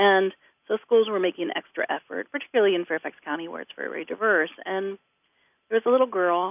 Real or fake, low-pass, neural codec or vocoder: real; 3.6 kHz; none